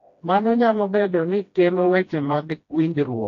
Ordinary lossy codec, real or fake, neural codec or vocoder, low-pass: AAC, 48 kbps; fake; codec, 16 kHz, 1 kbps, FreqCodec, smaller model; 7.2 kHz